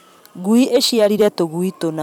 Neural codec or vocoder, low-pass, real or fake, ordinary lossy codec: vocoder, 44.1 kHz, 128 mel bands every 256 samples, BigVGAN v2; 19.8 kHz; fake; none